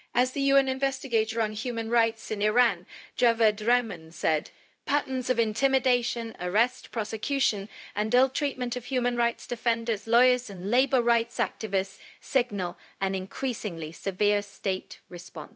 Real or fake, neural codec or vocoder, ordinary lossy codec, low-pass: fake; codec, 16 kHz, 0.4 kbps, LongCat-Audio-Codec; none; none